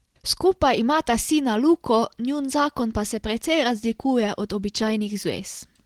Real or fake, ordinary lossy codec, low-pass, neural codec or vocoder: real; Opus, 16 kbps; 19.8 kHz; none